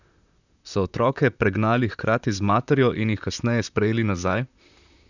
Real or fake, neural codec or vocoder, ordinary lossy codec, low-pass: fake; vocoder, 44.1 kHz, 128 mel bands, Pupu-Vocoder; none; 7.2 kHz